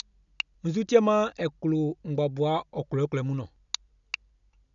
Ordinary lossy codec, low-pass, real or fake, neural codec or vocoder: none; 7.2 kHz; real; none